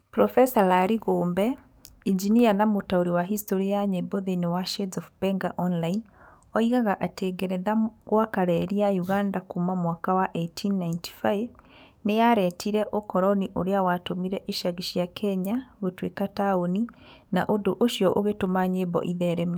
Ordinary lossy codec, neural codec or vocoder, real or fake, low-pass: none; codec, 44.1 kHz, 7.8 kbps, DAC; fake; none